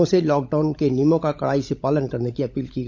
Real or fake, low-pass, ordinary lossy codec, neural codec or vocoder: fake; none; none; codec, 16 kHz, 16 kbps, FunCodec, trained on LibriTTS, 50 frames a second